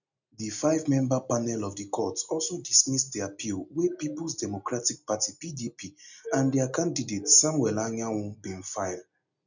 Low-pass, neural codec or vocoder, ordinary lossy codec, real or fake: 7.2 kHz; none; none; real